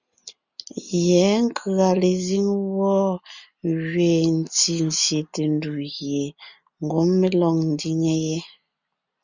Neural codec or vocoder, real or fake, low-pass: none; real; 7.2 kHz